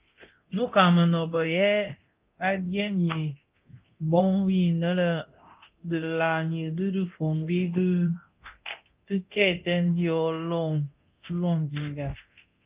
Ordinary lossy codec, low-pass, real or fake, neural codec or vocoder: Opus, 32 kbps; 3.6 kHz; fake; codec, 24 kHz, 0.9 kbps, DualCodec